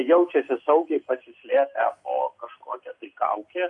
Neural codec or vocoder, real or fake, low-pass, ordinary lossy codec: none; real; 10.8 kHz; AAC, 64 kbps